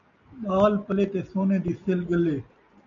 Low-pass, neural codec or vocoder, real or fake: 7.2 kHz; none; real